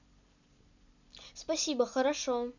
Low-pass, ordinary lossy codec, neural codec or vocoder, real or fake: 7.2 kHz; none; none; real